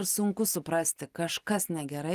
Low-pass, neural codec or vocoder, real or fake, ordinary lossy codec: 14.4 kHz; none; real; Opus, 32 kbps